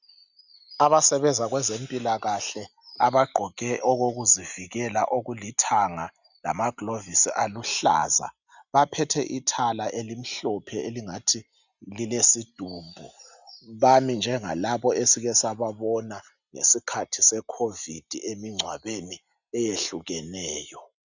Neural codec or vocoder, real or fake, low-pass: none; real; 7.2 kHz